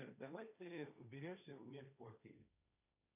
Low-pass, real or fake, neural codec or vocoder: 3.6 kHz; fake; codec, 16 kHz, 1.1 kbps, Voila-Tokenizer